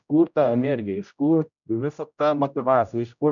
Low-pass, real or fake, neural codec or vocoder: 7.2 kHz; fake; codec, 16 kHz, 0.5 kbps, X-Codec, HuBERT features, trained on general audio